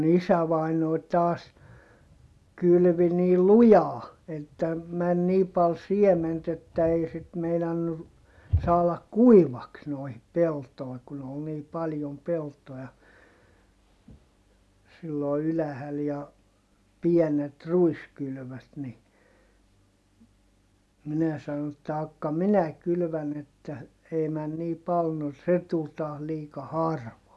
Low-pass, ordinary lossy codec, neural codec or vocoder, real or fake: none; none; none; real